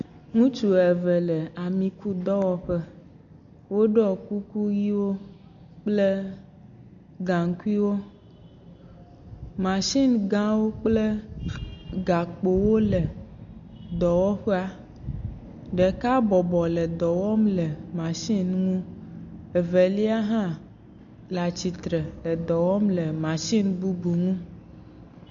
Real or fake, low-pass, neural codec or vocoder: real; 7.2 kHz; none